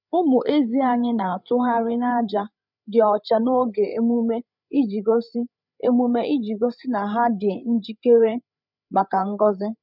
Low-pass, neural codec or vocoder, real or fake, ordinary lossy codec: 5.4 kHz; codec, 16 kHz, 8 kbps, FreqCodec, larger model; fake; none